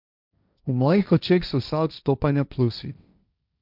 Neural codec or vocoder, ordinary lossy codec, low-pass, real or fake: codec, 16 kHz, 1.1 kbps, Voila-Tokenizer; none; 5.4 kHz; fake